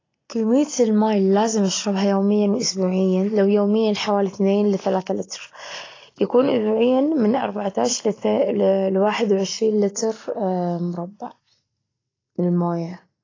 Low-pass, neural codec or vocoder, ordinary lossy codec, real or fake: 7.2 kHz; none; AAC, 32 kbps; real